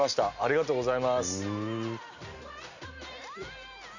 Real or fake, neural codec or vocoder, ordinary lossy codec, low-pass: real; none; none; 7.2 kHz